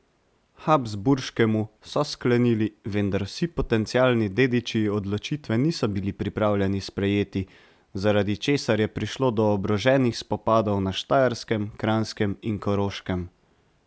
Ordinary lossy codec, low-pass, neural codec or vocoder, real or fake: none; none; none; real